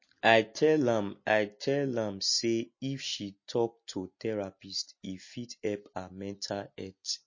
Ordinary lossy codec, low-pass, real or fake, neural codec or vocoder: MP3, 32 kbps; 7.2 kHz; real; none